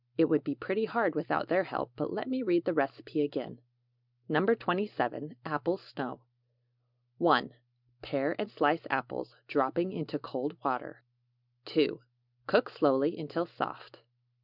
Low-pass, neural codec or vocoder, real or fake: 5.4 kHz; none; real